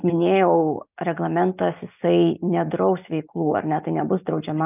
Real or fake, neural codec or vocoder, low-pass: real; none; 3.6 kHz